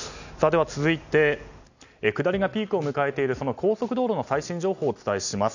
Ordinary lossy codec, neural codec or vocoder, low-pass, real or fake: none; none; 7.2 kHz; real